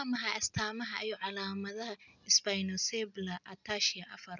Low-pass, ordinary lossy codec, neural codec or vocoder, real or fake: 7.2 kHz; none; none; real